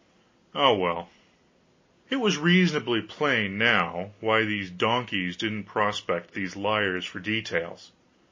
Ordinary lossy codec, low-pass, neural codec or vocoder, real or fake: MP3, 32 kbps; 7.2 kHz; none; real